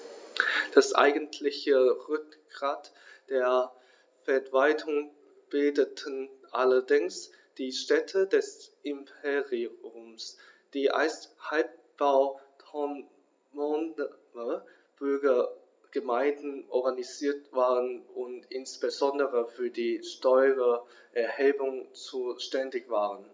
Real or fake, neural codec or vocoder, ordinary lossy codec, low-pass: real; none; none; none